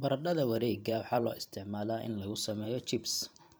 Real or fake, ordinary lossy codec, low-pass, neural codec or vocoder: fake; none; none; vocoder, 44.1 kHz, 128 mel bands every 512 samples, BigVGAN v2